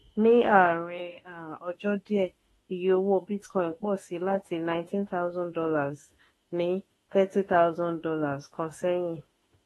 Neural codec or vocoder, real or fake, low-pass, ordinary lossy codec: autoencoder, 48 kHz, 32 numbers a frame, DAC-VAE, trained on Japanese speech; fake; 19.8 kHz; AAC, 32 kbps